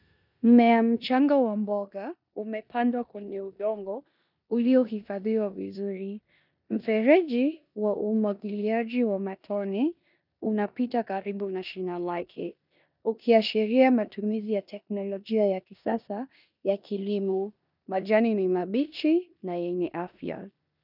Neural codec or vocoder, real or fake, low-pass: codec, 16 kHz in and 24 kHz out, 0.9 kbps, LongCat-Audio-Codec, four codebook decoder; fake; 5.4 kHz